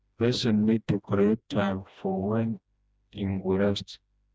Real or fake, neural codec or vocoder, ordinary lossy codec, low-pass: fake; codec, 16 kHz, 1 kbps, FreqCodec, smaller model; none; none